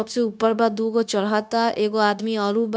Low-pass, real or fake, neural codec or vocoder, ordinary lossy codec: none; fake; codec, 16 kHz, 0.9 kbps, LongCat-Audio-Codec; none